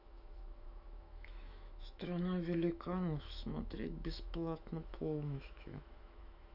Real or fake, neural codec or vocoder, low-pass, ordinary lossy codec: fake; autoencoder, 48 kHz, 128 numbers a frame, DAC-VAE, trained on Japanese speech; 5.4 kHz; none